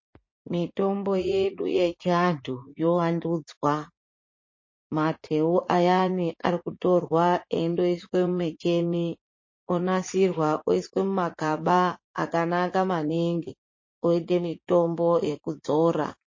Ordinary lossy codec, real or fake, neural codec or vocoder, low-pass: MP3, 32 kbps; fake; vocoder, 44.1 kHz, 80 mel bands, Vocos; 7.2 kHz